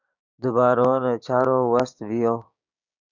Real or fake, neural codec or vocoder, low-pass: fake; codec, 16 kHz, 6 kbps, DAC; 7.2 kHz